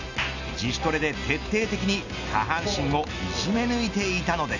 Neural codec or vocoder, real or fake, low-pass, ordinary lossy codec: none; real; 7.2 kHz; none